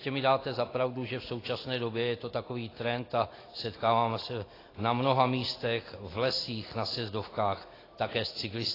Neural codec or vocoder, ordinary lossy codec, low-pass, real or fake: none; AAC, 24 kbps; 5.4 kHz; real